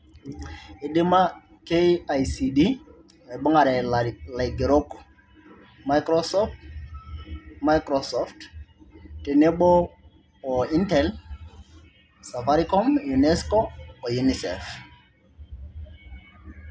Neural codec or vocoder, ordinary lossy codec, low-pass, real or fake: none; none; none; real